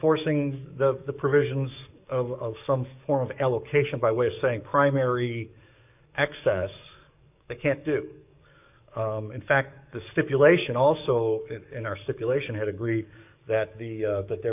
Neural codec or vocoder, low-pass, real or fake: codec, 44.1 kHz, 7.8 kbps, DAC; 3.6 kHz; fake